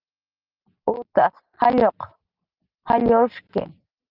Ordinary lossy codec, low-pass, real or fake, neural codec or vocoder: Opus, 32 kbps; 5.4 kHz; real; none